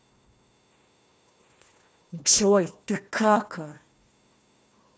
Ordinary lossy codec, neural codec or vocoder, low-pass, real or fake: none; codec, 16 kHz, 1 kbps, FunCodec, trained on Chinese and English, 50 frames a second; none; fake